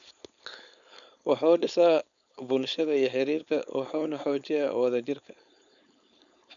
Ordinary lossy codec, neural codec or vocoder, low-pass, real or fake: MP3, 96 kbps; codec, 16 kHz, 4.8 kbps, FACodec; 7.2 kHz; fake